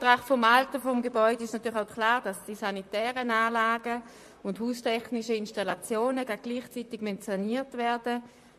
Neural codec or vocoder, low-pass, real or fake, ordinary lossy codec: vocoder, 44.1 kHz, 128 mel bands, Pupu-Vocoder; 14.4 kHz; fake; MP3, 64 kbps